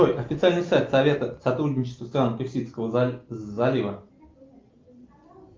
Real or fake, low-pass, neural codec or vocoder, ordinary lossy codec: real; 7.2 kHz; none; Opus, 24 kbps